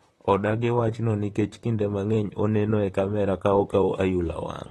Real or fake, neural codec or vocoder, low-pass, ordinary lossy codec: fake; vocoder, 44.1 kHz, 128 mel bands, Pupu-Vocoder; 19.8 kHz; AAC, 32 kbps